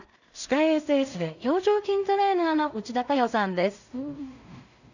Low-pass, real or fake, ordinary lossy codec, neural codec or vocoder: 7.2 kHz; fake; none; codec, 16 kHz in and 24 kHz out, 0.4 kbps, LongCat-Audio-Codec, two codebook decoder